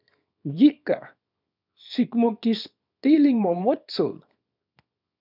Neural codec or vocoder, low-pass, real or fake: codec, 24 kHz, 0.9 kbps, WavTokenizer, small release; 5.4 kHz; fake